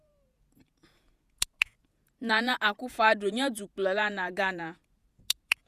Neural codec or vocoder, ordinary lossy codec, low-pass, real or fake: vocoder, 48 kHz, 128 mel bands, Vocos; Opus, 64 kbps; 14.4 kHz; fake